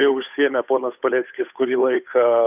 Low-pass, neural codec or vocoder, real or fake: 3.6 kHz; codec, 16 kHz, 8 kbps, FunCodec, trained on Chinese and English, 25 frames a second; fake